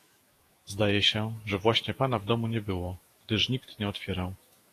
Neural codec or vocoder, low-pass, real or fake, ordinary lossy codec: autoencoder, 48 kHz, 128 numbers a frame, DAC-VAE, trained on Japanese speech; 14.4 kHz; fake; AAC, 48 kbps